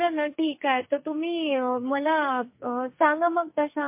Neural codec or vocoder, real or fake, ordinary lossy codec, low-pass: codec, 44.1 kHz, 2.6 kbps, SNAC; fake; MP3, 24 kbps; 3.6 kHz